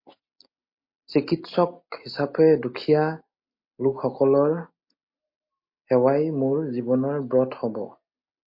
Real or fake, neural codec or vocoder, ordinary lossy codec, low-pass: real; none; MP3, 32 kbps; 5.4 kHz